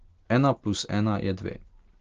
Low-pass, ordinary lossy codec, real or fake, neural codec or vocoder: 7.2 kHz; Opus, 16 kbps; real; none